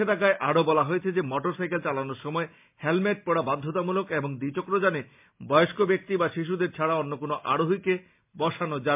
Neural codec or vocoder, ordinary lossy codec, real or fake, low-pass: none; MP3, 32 kbps; real; 3.6 kHz